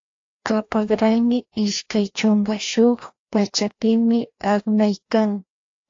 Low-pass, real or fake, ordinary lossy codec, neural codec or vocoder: 7.2 kHz; fake; AAC, 48 kbps; codec, 16 kHz, 1 kbps, FreqCodec, larger model